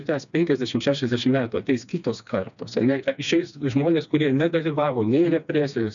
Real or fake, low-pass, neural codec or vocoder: fake; 7.2 kHz; codec, 16 kHz, 2 kbps, FreqCodec, smaller model